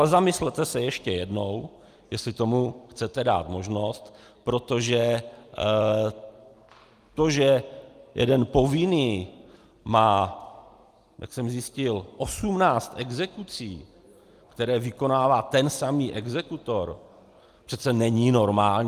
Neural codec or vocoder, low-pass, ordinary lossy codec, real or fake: none; 14.4 kHz; Opus, 32 kbps; real